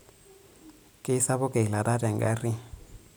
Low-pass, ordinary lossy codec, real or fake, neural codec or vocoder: none; none; real; none